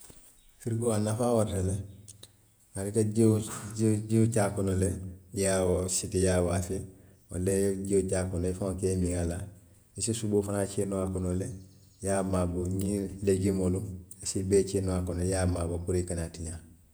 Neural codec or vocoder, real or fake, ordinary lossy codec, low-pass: vocoder, 48 kHz, 128 mel bands, Vocos; fake; none; none